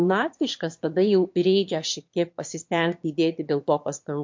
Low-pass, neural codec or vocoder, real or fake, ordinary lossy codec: 7.2 kHz; autoencoder, 22.05 kHz, a latent of 192 numbers a frame, VITS, trained on one speaker; fake; MP3, 48 kbps